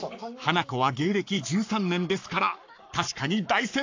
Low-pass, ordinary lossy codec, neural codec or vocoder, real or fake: 7.2 kHz; AAC, 48 kbps; codec, 44.1 kHz, 7.8 kbps, Pupu-Codec; fake